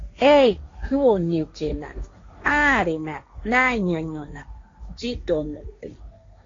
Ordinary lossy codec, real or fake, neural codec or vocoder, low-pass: AAC, 32 kbps; fake; codec, 16 kHz, 1.1 kbps, Voila-Tokenizer; 7.2 kHz